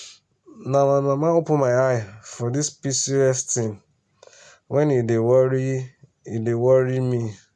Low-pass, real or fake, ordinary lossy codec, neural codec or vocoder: none; real; none; none